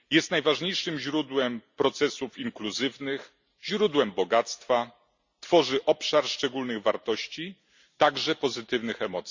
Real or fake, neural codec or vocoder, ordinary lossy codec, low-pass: real; none; Opus, 64 kbps; 7.2 kHz